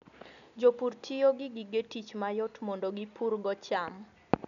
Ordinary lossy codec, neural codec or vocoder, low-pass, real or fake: none; none; 7.2 kHz; real